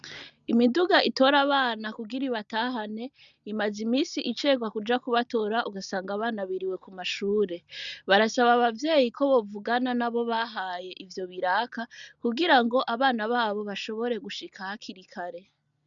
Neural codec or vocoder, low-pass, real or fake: none; 7.2 kHz; real